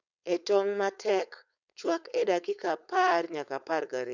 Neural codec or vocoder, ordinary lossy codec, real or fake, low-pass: codec, 16 kHz, 4.8 kbps, FACodec; none; fake; 7.2 kHz